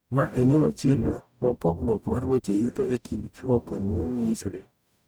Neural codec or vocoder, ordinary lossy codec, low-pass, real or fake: codec, 44.1 kHz, 0.9 kbps, DAC; none; none; fake